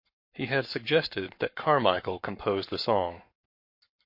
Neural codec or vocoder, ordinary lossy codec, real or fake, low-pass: codec, 44.1 kHz, 7.8 kbps, Pupu-Codec; MP3, 32 kbps; fake; 5.4 kHz